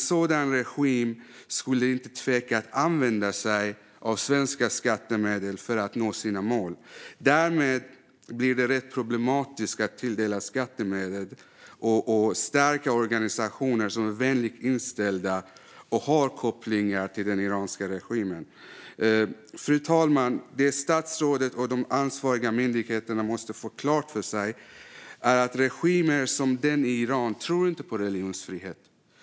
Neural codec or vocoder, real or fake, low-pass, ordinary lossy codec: none; real; none; none